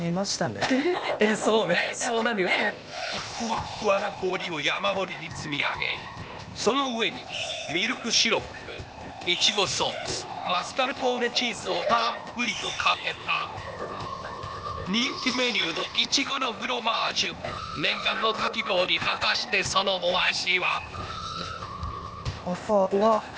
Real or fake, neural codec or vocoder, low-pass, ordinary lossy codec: fake; codec, 16 kHz, 0.8 kbps, ZipCodec; none; none